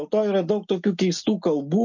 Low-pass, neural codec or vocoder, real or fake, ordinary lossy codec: 7.2 kHz; none; real; MP3, 48 kbps